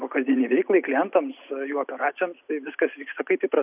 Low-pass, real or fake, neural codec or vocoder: 3.6 kHz; real; none